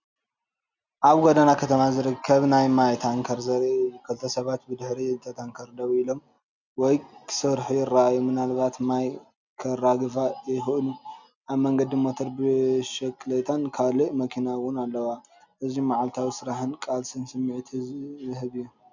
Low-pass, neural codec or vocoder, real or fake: 7.2 kHz; none; real